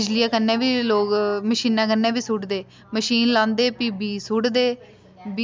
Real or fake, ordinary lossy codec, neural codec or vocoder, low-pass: real; none; none; none